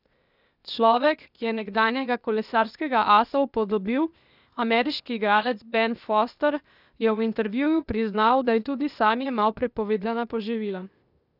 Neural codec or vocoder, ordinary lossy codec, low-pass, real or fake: codec, 16 kHz, 0.8 kbps, ZipCodec; none; 5.4 kHz; fake